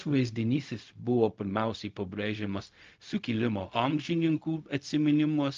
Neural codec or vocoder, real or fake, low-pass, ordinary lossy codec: codec, 16 kHz, 0.4 kbps, LongCat-Audio-Codec; fake; 7.2 kHz; Opus, 24 kbps